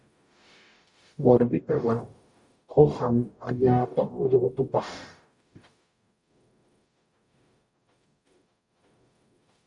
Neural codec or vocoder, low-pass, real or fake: codec, 44.1 kHz, 0.9 kbps, DAC; 10.8 kHz; fake